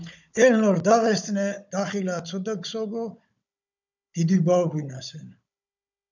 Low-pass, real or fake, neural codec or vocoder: 7.2 kHz; fake; codec, 16 kHz, 16 kbps, FunCodec, trained on Chinese and English, 50 frames a second